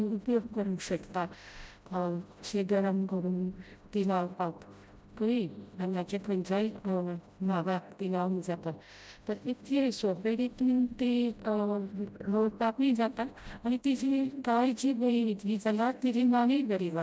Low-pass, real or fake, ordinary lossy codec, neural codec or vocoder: none; fake; none; codec, 16 kHz, 0.5 kbps, FreqCodec, smaller model